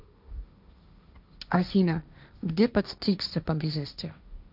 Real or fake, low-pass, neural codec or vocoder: fake; 5.4 kHz; codec, 16 kHz, 1.1 kbps, Voila-Tokenizer